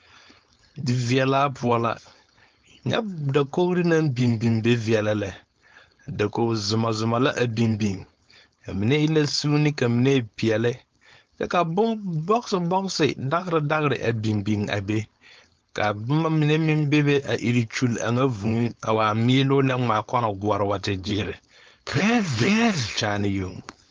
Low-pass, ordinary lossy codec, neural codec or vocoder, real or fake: 7.2 kHz; Opus, 24 kbps; codec, 16 kHz, 4.8 kbps, FACodec; fake